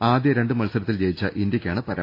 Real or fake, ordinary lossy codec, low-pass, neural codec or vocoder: real; AAC, 32 kbps; 5.4 kHz; none